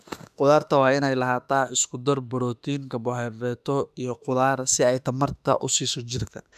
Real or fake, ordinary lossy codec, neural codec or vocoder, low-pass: fake; none; autoencoder, 48 kHz, 32 numbers a frame, DAC-VAE, trained on Japanese speech; 14.4 kHz